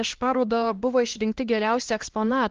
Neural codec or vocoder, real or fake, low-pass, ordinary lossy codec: codec, 16 kHz, 1 kbps, X-Codec, HuBERT features, trained on LibriSpeech; fake; 7.2 kHz; Opus, 16 kbps